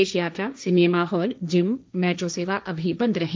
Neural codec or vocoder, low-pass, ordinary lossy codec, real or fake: codec, 16 kHz, 1.1 kbps, Voila-Tokenizer; none; none; fake